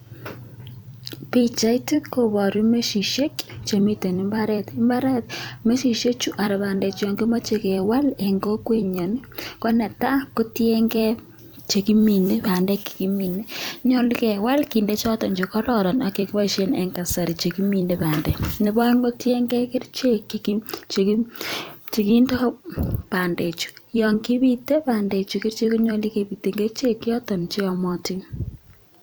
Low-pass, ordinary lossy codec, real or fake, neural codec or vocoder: none; none; fake; vocoder, 44.1 kHz, 128 mel bands every 256 samples, BigVGAN v2